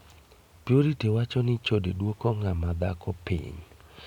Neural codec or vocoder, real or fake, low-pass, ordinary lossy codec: none; real; 19.8 kHz; none